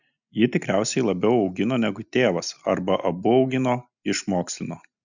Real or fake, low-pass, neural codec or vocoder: real; 7.2 kHz; none